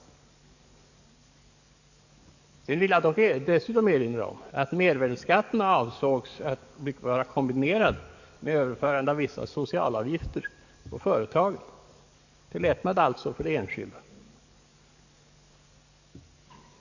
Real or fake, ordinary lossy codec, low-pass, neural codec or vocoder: fake; none; 7.2 kHz; codec, 44.1 kHz, 7.8 kbps, DAC